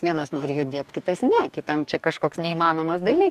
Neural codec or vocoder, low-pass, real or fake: codec, 44.1 kHz, 2.6 kbps, DAC; 14.4 kHz; fake